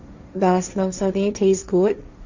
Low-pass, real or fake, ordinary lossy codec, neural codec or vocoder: 7.2 kHz; fake; Opus, 64 kbps; codec, 16 kHz, 1.1 kbps, Voila-Tokenizer